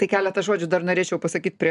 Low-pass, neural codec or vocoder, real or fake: 10.8 kHz; none; real